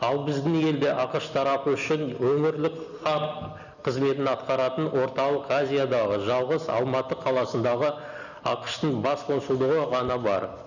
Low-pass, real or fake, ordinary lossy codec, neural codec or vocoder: 7.2 kHz; real; none; none